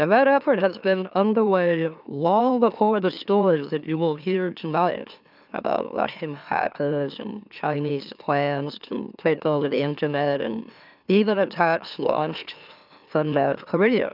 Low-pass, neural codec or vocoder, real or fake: 5.4 kHz; autoencoder, 44.1 kHz, a latent of 192 numbers a frame, MeloTTS; fake